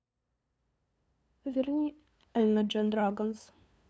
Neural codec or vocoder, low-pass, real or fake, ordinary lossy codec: codec, 16 kHz, 2 kbps, FunCodec, trained on LibriTTS, 25 frames a second; none; fake; none